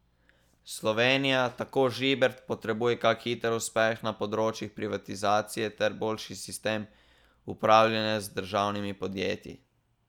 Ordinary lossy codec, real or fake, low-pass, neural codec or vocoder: none; real; 19.8 kHz; none